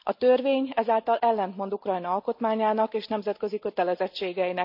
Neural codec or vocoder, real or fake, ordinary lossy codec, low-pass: none; real; none; 5.4 kHz